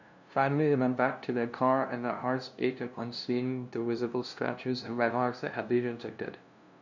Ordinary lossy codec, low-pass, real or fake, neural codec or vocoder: none; 7.2 kHz; fake; codec, 16 kHz, 0.5 kbps, FunCodec, trained on LibriTTS, 25 frames a second